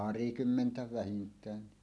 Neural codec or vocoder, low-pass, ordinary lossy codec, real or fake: none; none; none; real